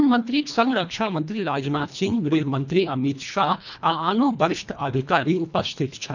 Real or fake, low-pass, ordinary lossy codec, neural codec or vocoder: fake; 7.2 kHz; none; codec, 24 kHz, 1.5 kbps, HILCodec